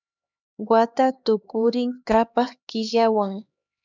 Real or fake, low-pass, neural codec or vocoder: fake; 7.2 kHz; codec, 16 kHz, 2 kbps, X-Codec, HuBERT features, trained on LibriSpeech